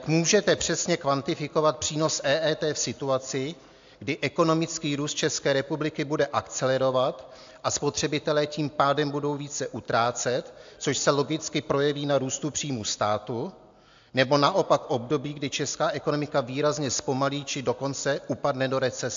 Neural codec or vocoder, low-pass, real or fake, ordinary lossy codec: none; 7.2 kHz; real; AAC, 64 kbps